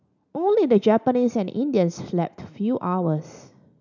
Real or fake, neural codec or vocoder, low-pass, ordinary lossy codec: real; none; 7.2 kHz; none